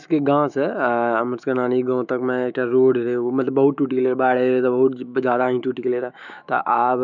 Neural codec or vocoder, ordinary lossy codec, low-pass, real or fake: autoencoder, 48 kHz, 128 numbers a frame, DAC-VAE, trained on Japanese speech; none; 7.2 kHz; fake